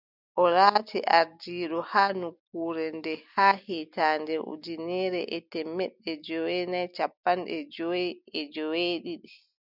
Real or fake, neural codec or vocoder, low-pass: real; none; 5.4 kHz